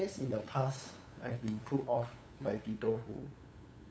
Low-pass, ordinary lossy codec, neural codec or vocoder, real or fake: none; none; codec, 16 kHz, 8 kbps, FunCodec, trained on LibriTTS, 25 frames a second; fake